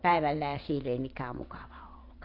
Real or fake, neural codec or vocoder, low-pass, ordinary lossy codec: fake; vocoder, 22.05 kHz, 80 mel bands, WaveNeXt; 5.4 kHz; AAC, 32 kbps